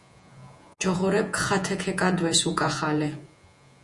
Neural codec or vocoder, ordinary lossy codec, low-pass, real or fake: vocoder, 48 kHz, 128 mel bands, Vocos; Opus, 64 kbps; 10.8 kHz; fake